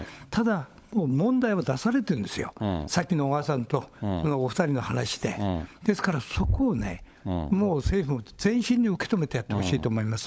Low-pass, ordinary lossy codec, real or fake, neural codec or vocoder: none; none; fake; codec, 16 kHz, 16 kbps, FunCodec, trained on LibriTTS, 50 frames a second